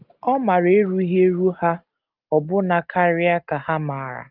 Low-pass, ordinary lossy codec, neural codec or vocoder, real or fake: 5.4 kHz; Opus, 32 kbps; none; real